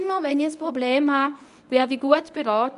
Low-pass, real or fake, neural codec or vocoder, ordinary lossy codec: 10.8 kHz; fake; codec, 24 kHz, 0.9 kbps, WavTokenizer, medium speech release version 1; none